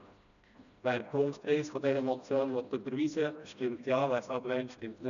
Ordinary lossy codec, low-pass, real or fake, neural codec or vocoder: none; 7.2 kHz; fake; codec, 16 kHz, 1 kbps, FreqCodec, smaller model